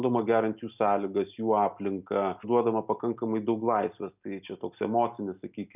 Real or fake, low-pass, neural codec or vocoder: real; 3.6 kHz; none